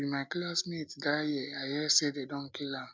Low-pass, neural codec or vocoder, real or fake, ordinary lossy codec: none; none; real; none